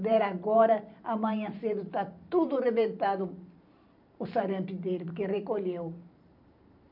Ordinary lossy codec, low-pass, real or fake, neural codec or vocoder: none; 5.4 kHz; fake; vocoder, 44.1 kHz, 128 mel bands every 512 samples, BigVGAN v2